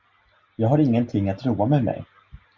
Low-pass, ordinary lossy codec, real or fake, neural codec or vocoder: 7.2 kHz; Opus, 64 kbps; real; none